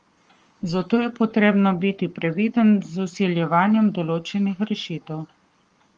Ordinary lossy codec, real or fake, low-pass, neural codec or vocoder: Opus, 24 kbps; fake; 9.9 kHz; codec, 44.1 kHz, 7.8 kbps, Pupu-Codec